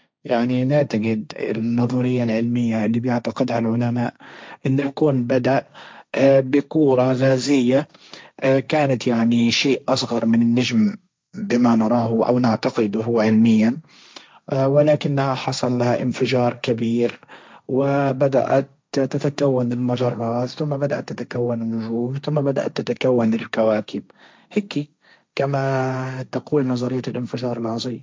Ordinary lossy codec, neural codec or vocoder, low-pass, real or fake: none; codec, 16 kHz, 1.1 kbps, Voila-Tokenizer; none; fake